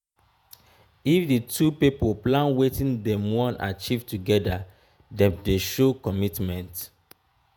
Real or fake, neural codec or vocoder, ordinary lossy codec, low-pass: fake; vocoder, 48 kHz, 128 mel bands, Vocos; none; none